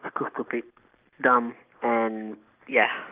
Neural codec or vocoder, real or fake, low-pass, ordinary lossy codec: none; real; 3.6 kHz; Opus, 24 kbps